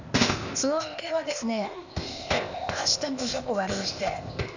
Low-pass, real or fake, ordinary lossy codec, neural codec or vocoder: 7.2 kHz; fake; none; codec, 16 kHz, 0.8 kbps, ZipCodec